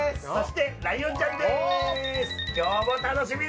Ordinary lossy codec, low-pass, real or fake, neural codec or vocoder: none; none; real; none